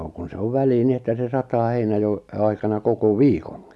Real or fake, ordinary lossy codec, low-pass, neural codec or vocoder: real; none; none; none